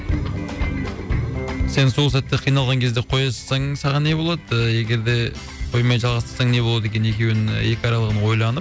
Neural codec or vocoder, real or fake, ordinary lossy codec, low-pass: none; real; none; none